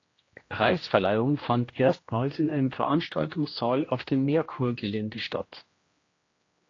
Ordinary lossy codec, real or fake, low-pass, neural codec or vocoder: AAC, 32 kbps; fake; 7.2 kHz; codec, 16 kHz, 1 kbps, X-Codec, HuBERT features, trained on general audio